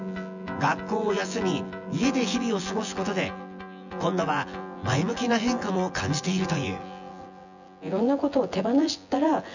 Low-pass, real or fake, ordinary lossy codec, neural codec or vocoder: 7.2 kHz; fake; none; vocoder, 24 kHz, 100 mel bands, Vocos